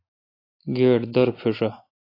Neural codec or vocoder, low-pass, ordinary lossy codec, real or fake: none; 5.4 kHz; AAC, 24 kbps; real